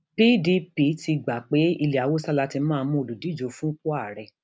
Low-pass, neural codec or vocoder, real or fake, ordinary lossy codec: none; none; real; none